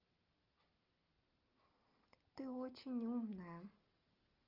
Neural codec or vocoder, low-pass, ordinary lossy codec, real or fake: none; 5.4 kHz; Opus, 24 kbps; real